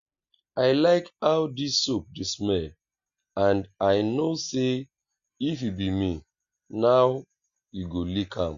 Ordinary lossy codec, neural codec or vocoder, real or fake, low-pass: Opus, 64 kbps; none; real; 7.2 kHz